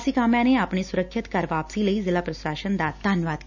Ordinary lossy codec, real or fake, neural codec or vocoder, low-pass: none; real; none; 7.2 kHz